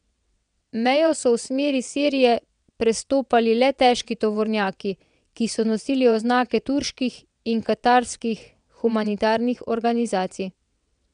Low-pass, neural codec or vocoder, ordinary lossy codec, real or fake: 9.9 kHz; vocoder, 22.05 kHz, 80 mel bands, WaveNeXt; none; fake